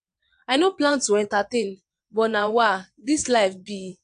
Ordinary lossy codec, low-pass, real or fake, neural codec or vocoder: AAC, 64 kbps; 9.9 kHz; fake; vocoder, 22.05 kHz, 80 mel bands, WaveNeXt